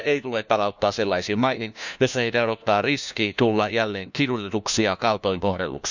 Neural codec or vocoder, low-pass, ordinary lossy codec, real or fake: codec, 16 kHz, 1 kbps, FunCodec, trained on LibriTTS, 50 frames a second; 7.2 kHz; none; fake